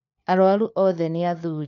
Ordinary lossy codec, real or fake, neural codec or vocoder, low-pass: none; fake; codec, 16 kHz, 4 kbps, FunCodec, trained on LibriTTS, 50 frames a second; 7.2 kHz